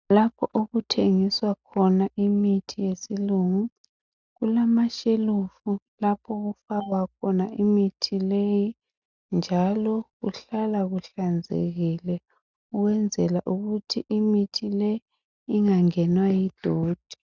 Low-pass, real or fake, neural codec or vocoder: 7.2 kHz; real; none